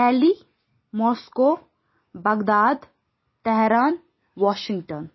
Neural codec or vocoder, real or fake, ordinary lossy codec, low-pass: none; real; MP3, 24 kbps; 7.2 kHz